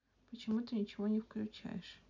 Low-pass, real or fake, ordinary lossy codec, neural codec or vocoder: 7.2 kHz; real; none; none